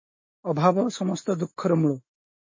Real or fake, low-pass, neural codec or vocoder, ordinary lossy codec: fake; 7.2 kHz; autoencoder, 48 kHz, 128 numbers a frame, DAC-VAE, trained on Japanese speech; MP3, 32 kbps